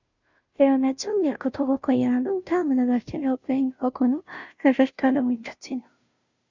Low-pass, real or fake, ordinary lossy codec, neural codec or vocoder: 7.2 kHz; fake; Opus, 64 kbps; codec, 16 kHz, 0.5 kbps, FunCodec, trained on Chinese and English, 25 frames a second